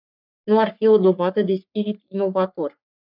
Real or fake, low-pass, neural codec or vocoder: fake; 5.4 kHz; codec, 44.1 kHz, 3.4 kbps, Pupu-Codec